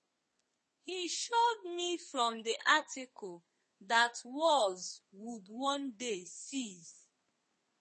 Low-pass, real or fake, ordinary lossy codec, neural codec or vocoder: 10.8 kHz; fake; MP3, 32 kbps; codec, 32 kHz, 1.9 kbps, SNAC